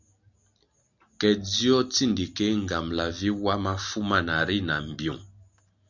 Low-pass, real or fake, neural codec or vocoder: 7.2 kHz; real; none